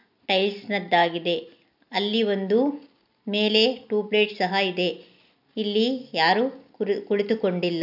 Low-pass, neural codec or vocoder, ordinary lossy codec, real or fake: 5.4 kHz; none; none; real